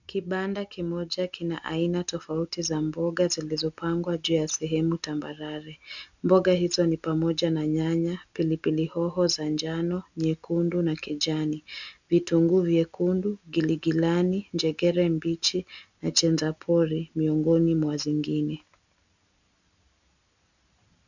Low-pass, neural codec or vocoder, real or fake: 7.2 kHz; none; real